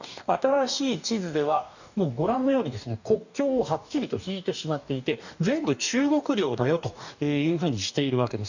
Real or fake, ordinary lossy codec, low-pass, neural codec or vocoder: fake; none; 7.2 kHz; codec, 44.1 kHz, 2.6 kbps, DAC